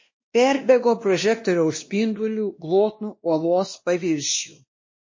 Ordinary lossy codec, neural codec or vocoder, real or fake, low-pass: MP3, 32 kbps; codec, 16 kHz, 1 kbps, X-Codec, WavLM features, trained on Multilingual LibriSpeech; fake; 7.2 kHz